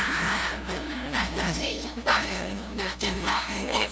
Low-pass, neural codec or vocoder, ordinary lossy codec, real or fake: none; codec, 16 kHz, 0.5 kbps, FunCodec, trained on LibriTTS, 25 frames a second; none; fake